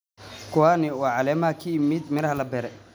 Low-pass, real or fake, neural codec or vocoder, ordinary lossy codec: none; real; none; none